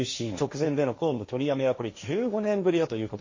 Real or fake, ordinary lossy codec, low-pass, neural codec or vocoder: fake; MP3, 32 kbps; 7.2 kHz; codec, 16 kHz, 1.1 kbps, Voila-Tokenizer